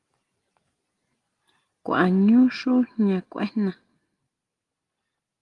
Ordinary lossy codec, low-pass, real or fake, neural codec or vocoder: Opus, 24 kbps; 10.8 kHz; real; none